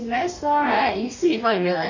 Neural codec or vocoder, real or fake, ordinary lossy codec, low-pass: codec, 44.1 kHz, 2.6 kbps, DAC; fake; none; 7.2 kHz